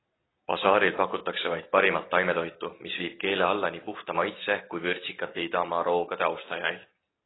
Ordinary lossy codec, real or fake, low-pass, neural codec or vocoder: AAC, 16 kbps; real; 7.2 kHz; none